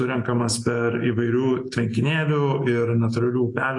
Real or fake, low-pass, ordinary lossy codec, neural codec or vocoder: real; 10.8 kHz; AAC, 48 kbps; none